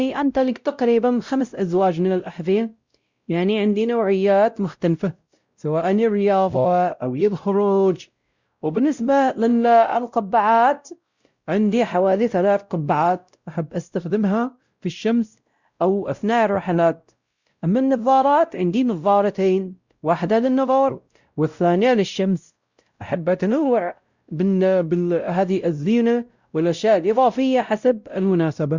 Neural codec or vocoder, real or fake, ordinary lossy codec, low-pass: codec, 16 kHz, 0.5 kbps, X-Codec, WavLM features, trained on Multilingual LibriSpeech; fake; Opus, 64 kbps; 7.2 kHz